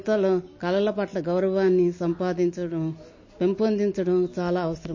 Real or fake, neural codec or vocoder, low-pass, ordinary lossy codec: real; none; 7.2 kHz; MP3, 32 kbps